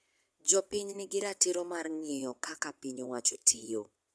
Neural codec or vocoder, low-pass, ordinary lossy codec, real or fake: vocoder, 22.05 kHz, 80 mel bands, Vocos; none; none; fake